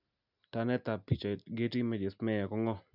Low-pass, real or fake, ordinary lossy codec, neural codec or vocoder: 5.4 kHz; real; none; none